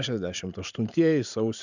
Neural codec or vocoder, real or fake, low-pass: vocoder, 44.1 kHz, 128 mel bands every 512 samples, BigVGAN v2; fake; 7.2 kHz